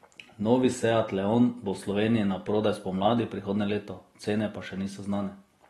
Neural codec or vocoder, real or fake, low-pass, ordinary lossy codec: none; real; 19.8 kHz; AAC, 32 kbps